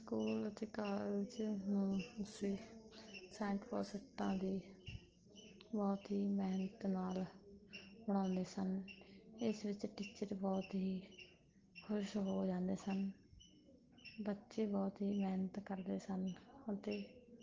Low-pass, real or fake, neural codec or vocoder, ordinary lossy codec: 7.2 kHz; real; none; Opus, 16 kbps